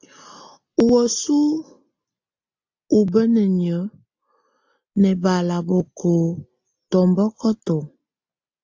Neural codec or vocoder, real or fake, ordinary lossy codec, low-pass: none; real; AAC, 48 kbps; 7.2 kHz